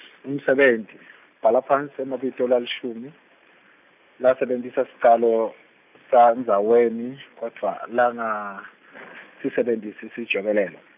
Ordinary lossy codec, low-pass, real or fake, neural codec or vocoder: none; 3.6 kHz; real; none